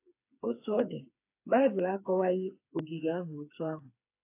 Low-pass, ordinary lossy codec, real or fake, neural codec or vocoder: 3.6 kHz; AAC, 24 kbps; fake; codec, 16 kHz, 4 kbps, FreqCodec, smaller model